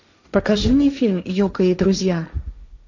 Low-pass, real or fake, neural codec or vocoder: 7.2 kHz; fake; codec, 16 kHz, 1.1 kbps, Voila-Tokenizer